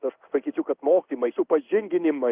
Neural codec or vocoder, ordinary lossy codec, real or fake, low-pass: codec, 16 kHz in and 24 kHz out, 1 kbps, XY-Tokenizer; Opus, 32 kbps; fake; 3.6 kHz